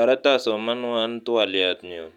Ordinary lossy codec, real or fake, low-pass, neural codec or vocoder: none; real; 19.8 kHz; none